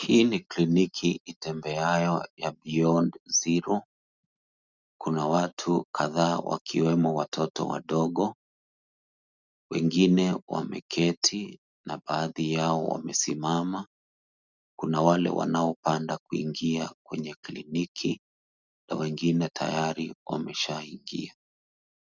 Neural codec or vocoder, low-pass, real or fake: none; 7.2 kHz; real